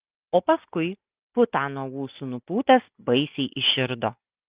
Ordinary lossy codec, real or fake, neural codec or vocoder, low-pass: Opus, 32 kbps; real; none; 3.6 kHz